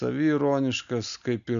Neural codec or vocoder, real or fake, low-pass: none; real; 7.2 kHz